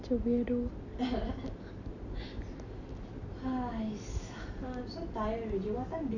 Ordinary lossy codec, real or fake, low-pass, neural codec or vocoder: none; real; 7.2 kHz; none